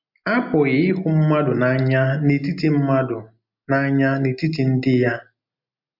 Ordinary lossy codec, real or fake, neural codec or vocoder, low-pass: MP3, 48 kbps; real; none; 5.4 kHz